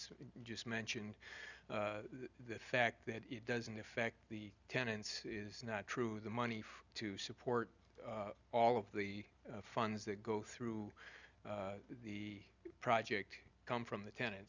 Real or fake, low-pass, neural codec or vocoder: real; 7.2 kHz; none